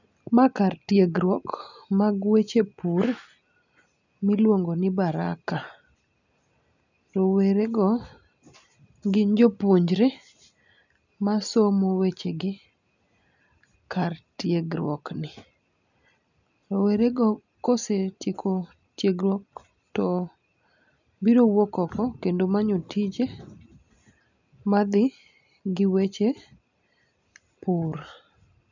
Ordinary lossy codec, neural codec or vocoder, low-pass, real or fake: none; none; 7.2 kHz; real